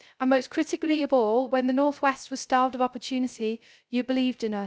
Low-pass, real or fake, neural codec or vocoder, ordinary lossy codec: none; fake; codec, 16 kHz, 0.3 kbps, FocalCodec; none